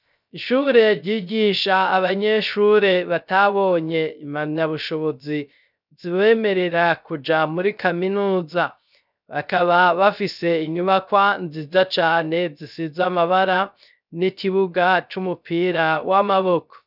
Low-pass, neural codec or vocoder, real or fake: 5.4 kHz; codec, 16 kHz, 0.3 kbps, FocalCodec; fake